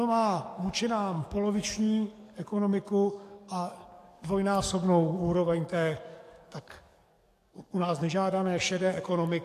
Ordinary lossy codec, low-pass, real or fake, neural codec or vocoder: AAC, 64 kbps; 14.4 kHz; fake; codec, 44.1 kHz, 7.8 kbps, DAC